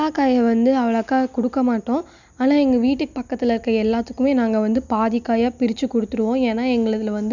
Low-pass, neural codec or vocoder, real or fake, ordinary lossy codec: 7.2 kHz; none; real; none